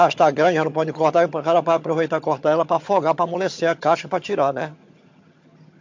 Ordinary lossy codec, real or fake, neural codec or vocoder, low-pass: MP3, 48 kbps; fake; vocoder, 22.05 kHz, 80 mel bands, HiFi-GAN; 7.2 kHz